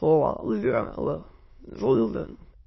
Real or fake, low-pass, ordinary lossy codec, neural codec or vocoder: fake; 7.2 kHz; MP3, 24 kbps; autoencoder, 22.05 kHz, a latent of 192 numbers a frame, VITS, trained on many speakers